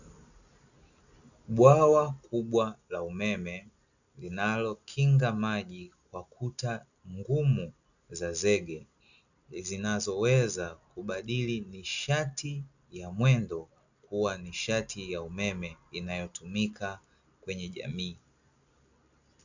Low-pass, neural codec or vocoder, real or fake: 7.2 kHz; none; real